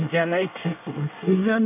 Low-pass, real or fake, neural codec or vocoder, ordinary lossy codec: 3.6 kHz; fake; codec, 24 kHz, 1 kbps, SNAC; none